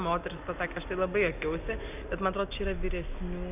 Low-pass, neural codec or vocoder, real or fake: 3.6 kHz; none; real